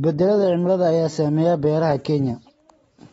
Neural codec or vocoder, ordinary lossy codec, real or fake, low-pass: none; AAC, 24 kbps; real; 19.8 kHz